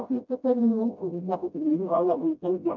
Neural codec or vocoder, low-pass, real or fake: codec, 16 kHz, 0.5 kbps, FreqCodec, smaller model; 7.2 kHz; fake